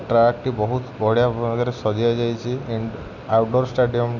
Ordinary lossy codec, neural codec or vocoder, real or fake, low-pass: none; none; real; 7.2 kHz